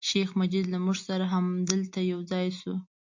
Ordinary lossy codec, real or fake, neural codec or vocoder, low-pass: MP3, 48 kbps; real; none; 7.2 kHz